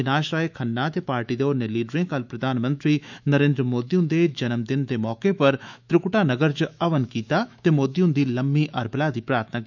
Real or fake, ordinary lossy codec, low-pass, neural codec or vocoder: fake; none; 7.2 kHz; autoencoder, 48 kHz, 128 numbers a frame, DAC-VAE, trained on Japanese speech